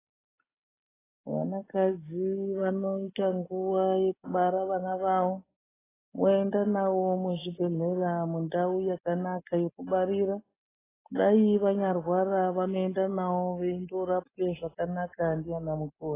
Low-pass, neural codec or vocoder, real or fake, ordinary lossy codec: 3.6 kHz; none; real; AAC, 16 kbps